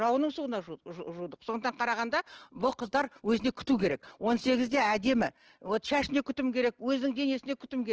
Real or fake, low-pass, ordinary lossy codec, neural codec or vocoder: real; 7.2 kHz; Opus, 16 kbps; none